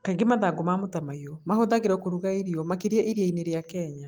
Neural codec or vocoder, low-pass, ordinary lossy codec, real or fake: none; 19.8 kHz; Opus, 24 kbps; real